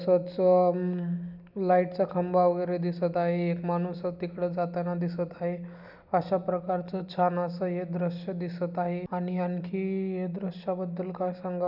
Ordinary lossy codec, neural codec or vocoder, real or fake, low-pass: none; none; real; 5.4 kHz